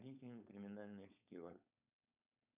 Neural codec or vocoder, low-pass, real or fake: codec, 16 kHz, 4.8 kbps, FACodec; 3.6 kHz; fake